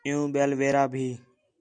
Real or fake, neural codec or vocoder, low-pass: real; none; 9.9 kHz